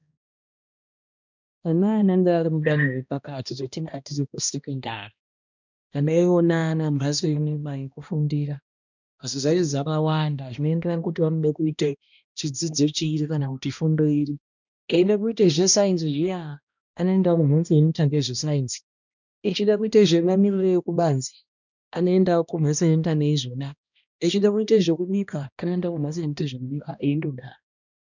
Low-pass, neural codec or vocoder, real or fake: 7.2 kHz; codec, 16 kHz, 1 kbps, X-Codec, HuBERT features, trained on balanced general audio; fake